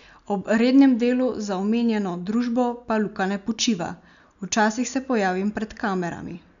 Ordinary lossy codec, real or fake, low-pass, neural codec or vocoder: none; real; 7.2 kHz; none